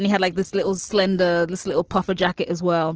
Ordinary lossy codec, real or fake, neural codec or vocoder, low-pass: Opus, 16 kbps; real; none; 7.2 kHz